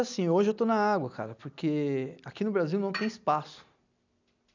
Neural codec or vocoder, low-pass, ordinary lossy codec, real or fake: none; 7.2 kHz; none; real